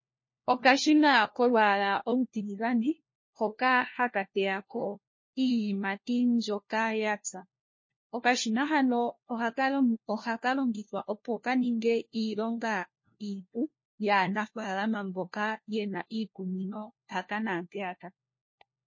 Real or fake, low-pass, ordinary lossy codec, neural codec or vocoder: fake; 7.2 kHz; MP3, 32 kbps; codec, 16 kHz, 1 kbps, FunCodec, trained on LibriTTS, 50 frames a second